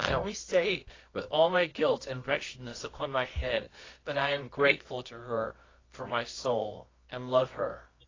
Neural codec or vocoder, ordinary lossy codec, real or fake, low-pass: codec, 24 kHz, 0.9 kbps, WavTokenizer, medium music audio release; AAC, 32 kbps; fake; 7.2 kHz